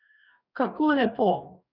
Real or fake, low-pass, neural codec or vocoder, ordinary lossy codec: fake; 5.4 kHz; codec, 44.1 kHz, 2.6 kbps, DAC; none